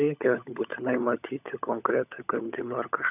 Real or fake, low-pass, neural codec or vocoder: fake; 3.6 kHz; codec, 16 kHz, 16 kbps, FunCodec, trained on LibriTTS, 50 frames a second